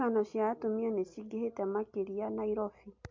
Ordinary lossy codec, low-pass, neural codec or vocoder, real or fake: MP3, 48 kbps; 7.2 kHz; none; real